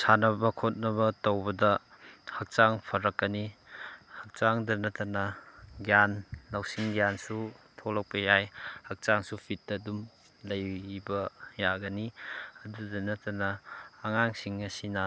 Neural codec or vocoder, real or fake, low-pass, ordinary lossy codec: none; real; none; none